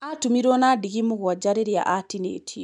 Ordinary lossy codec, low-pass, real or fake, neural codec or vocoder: none; 10.8 kHz; real; none